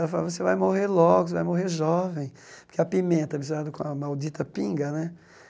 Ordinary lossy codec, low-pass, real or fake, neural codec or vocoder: none; none; real; none